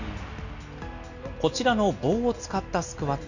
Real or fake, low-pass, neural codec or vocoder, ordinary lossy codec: real; 7.2 kHz; none; none